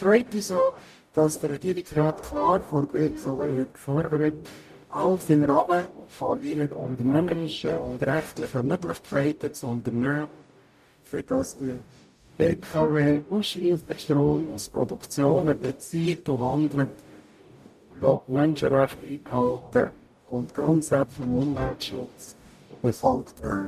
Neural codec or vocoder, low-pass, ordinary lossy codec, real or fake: codec, 44.1 kHz, 0.9 kbps, DAC; 14.4 kHz; MP3, 96 kbps; fake